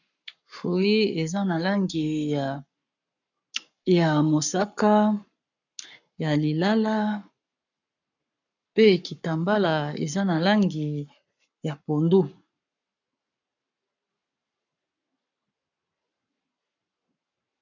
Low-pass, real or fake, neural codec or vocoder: 7.2 kHz; fake; codec, 44.1 kHz, 7.8 kbps, Pupu-Codec